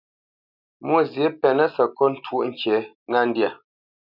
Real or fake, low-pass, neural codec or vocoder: real; 5.4 kHz; none